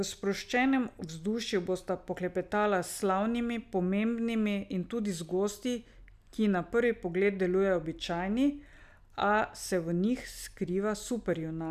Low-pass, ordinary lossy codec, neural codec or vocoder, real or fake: 14.4 kHz; none; none; real